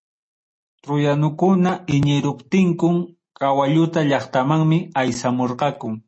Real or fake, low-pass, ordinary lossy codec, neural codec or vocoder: real; 9.9 kHz; MP3, 32 kbps; none